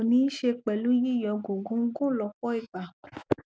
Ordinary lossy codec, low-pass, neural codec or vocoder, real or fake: none; none; none; real